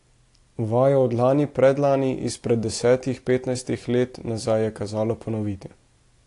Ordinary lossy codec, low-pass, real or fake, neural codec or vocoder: AAC, 48 kbps; 10.8 kHz; real; none